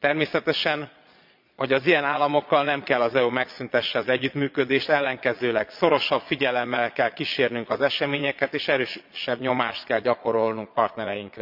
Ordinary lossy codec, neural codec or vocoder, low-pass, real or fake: MP3, 48 kbps; vocoder, 22.05 kHz, 80 mel bands, Vocos; 5.4 kHz; fake